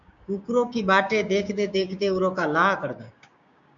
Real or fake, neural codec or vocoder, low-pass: fake; codec, 16 kHz, 6 kbps, DAC; 7.2 kHz